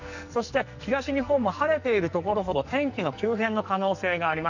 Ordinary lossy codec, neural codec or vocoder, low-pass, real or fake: none; codec, 44.1 kHz, 2.6 kbps, SNAC; 7.2 kHz; fake